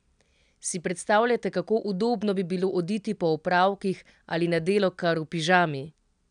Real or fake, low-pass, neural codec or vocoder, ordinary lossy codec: real; 9.9 kHz; none; none